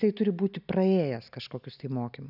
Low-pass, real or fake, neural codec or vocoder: 5.4 kHz; real; none